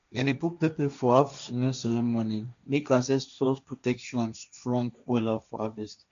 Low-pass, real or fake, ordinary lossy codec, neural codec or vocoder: 7.2 kHz; fake; MP3, 64 kbps; codec, 16 kHz, 1.1 kbps, Voila-Tokenizer